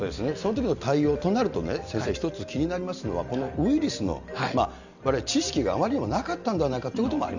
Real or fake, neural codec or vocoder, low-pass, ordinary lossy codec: real; none; 7.2 kHz; none